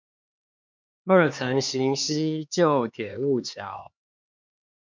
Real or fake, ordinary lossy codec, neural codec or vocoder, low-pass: fake; MP3, 64 kbps; codec, 16 kHz, 2 kbps, X-Codec, HuBERT features, trained on LibriSpeech; 7.2 kHz